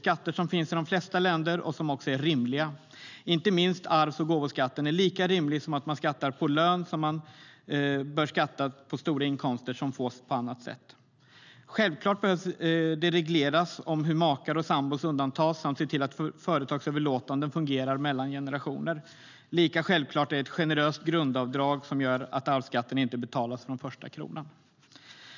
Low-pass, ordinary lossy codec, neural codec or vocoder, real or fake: 7.2 kHz; none; none; real